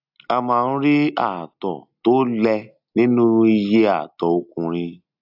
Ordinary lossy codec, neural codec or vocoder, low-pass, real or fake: AAC, 48 kbps; none; 5.4 kHz; real